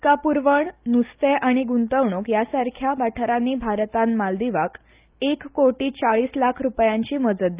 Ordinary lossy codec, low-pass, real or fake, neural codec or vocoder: Opus, 24 kbps; 3.6 kHz; real; none